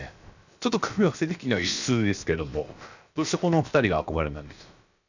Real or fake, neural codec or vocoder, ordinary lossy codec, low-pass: fake; codec, 16 kHz, about 1 kbps, DyCAST, with the encoder's durations; none; 7.2 kHz